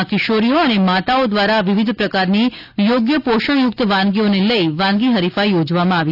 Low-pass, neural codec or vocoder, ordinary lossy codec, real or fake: 5.4 kHz; none; none; real